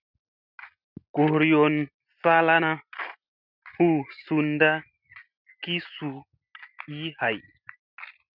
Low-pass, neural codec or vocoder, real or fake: 5.4 kHz; none; real